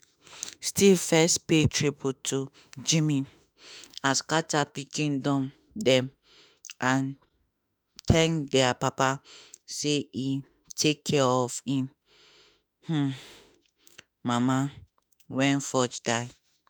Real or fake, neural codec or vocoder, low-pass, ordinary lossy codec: fake; autoencoder, 48 kHz, 32 numbers a frame, DAC-VAE, trained on Japanese speech; none; none